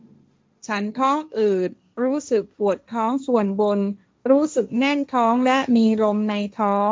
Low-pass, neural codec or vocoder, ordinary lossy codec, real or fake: none; codec, 16 kHz, 1.1 kbps, Voila-Tokenizer; none; fake